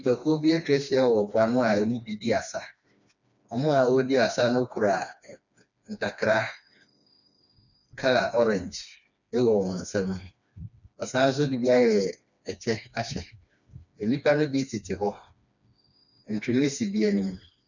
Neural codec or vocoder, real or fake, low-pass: codec, 16 kHz, 2 kbps, FreqCodec, smaller model; fake; 7.2 kHz